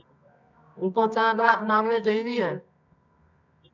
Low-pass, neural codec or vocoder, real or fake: 7.2 kHz; codec, 24 kHz, 0.9 kbps, WavTokenizer, medium music audio release; fake